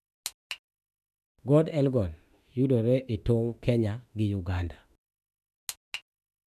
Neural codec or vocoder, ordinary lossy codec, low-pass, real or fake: autoencoder, 48 kHz, 32 numbers a frame, DAC-VAE, trained on Japanese speech; none; 14.4 kHz; fake